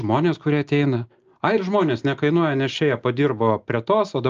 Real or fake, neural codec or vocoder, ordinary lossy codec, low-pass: real; none; Opus, 24 kbps; 7.2 kHz